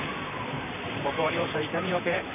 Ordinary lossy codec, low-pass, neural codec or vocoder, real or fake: none; 3.6 kHz; vocoder, 44.1 kHz, 128 mel bands, Pupu-Vocoder; fake